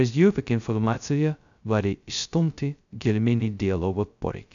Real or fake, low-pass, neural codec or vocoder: fake; 7.2 kHz; codec, 16 kHz, 0.2 kbps, FocalCodec